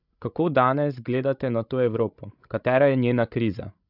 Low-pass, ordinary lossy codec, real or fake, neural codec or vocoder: 5.4 kHz; none; fake; codec, 16 kHz, 16 kbps, FreqCodec, larger model